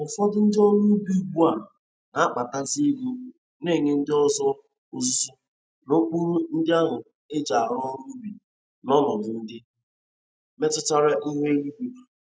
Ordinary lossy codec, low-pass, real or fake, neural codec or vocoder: none; none; real; none